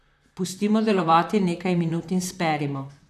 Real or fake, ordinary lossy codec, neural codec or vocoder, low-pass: fake; none; vocoder, 44.1 kHz, 128 mel bands, Pupu-Vocoder; 14.4 kHz